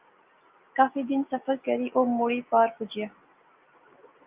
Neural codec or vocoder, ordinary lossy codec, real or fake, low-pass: none; Opus, 24 kbps; real; 3.6 kHz